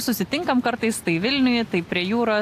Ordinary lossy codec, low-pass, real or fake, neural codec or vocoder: AAC, 64 kbps; 14.4 kHz; real; none